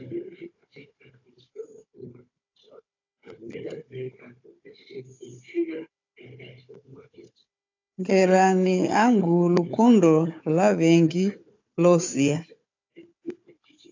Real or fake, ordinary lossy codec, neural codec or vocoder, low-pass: fake; AAC, 48 kbps; codec, 16 kHz, 4 kbps, FunCodec, trained on Chinese and English, 50 frames a second; 7.2 kHz